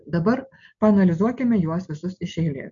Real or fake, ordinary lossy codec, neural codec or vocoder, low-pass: real; MP3, 64 kbps; none; 7.2 kHz